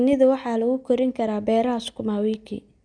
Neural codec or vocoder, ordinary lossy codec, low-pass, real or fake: none; none; 9.9 kHz; real